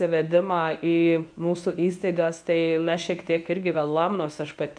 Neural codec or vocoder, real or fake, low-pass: codec, 24 kHz, 0.9 kbps, WavTokenizer, medium speech release version 1; fake; 9.9 kHz